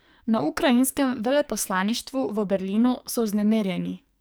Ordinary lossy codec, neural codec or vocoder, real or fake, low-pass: none; codec, 44.1 kHz, 2.6 kbps, SNAC; fake; none